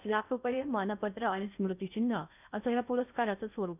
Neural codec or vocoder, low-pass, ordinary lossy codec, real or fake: codec, 16 kHz in and 24 kHz out, 0.6 kbps, FocalCodec, streaming, 2048 codes; 3.6 kHz; none; fake